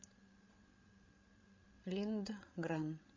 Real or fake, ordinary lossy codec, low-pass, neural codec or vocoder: fake; MP3, 32 kbps; 7.2 kHz; codec, 16 kHz, 16 kbps, FreqCodec, larger model